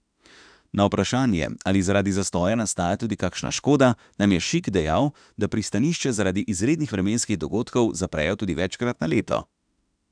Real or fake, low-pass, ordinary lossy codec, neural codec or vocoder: fake; 9.9 kHz; none; autoencoder, 48 kHz, 32 numbers a frame, DAC-VAE, trained on Japanese speech